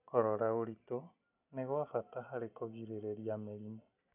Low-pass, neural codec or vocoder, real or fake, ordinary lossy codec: 3.6 kHz; none; real; none